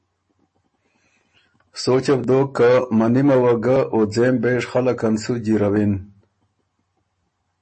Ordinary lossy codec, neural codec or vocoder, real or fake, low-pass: MP3, 32 kbps; none; real; 10.8 kHz